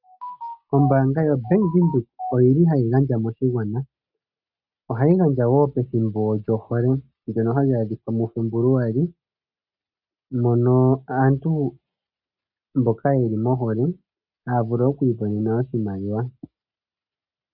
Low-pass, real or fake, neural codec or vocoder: 5.4 kHz; real; none